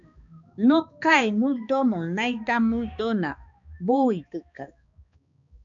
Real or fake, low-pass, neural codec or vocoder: fake; 7.2 kHz; codec, 16 kHz, 2 kbps, X-Codec, HuBERT features, trained on balanced general audio